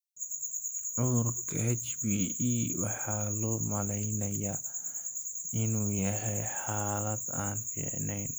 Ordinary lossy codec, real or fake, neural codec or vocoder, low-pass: none; real; none; none